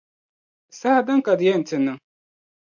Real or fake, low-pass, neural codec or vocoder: real; 7.2 kHz; none